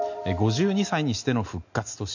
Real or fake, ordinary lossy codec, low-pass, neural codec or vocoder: real; none; 7.2 kHz; none